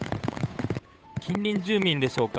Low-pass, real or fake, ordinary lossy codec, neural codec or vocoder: none; fake; none; codec, 16 kHz, 8 kbps, FunCodec, trained on Chinese and English, 25 frames a second